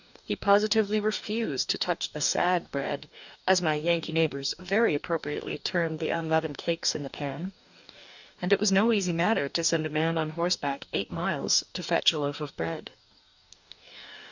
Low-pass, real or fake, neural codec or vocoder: 7.2 kHz; fake; codec, 44.1 kHz, 2.6 kbps, DAC